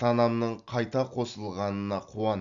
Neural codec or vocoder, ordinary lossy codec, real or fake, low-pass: none; Opus, 64 kbps; real; 7.2 kHz